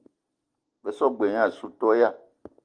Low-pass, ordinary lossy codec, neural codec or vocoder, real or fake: 9.9 kHz; Opus, 24 kbps; none; real